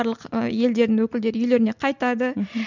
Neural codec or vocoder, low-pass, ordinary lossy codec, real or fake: none; 7.2 kHz; none; real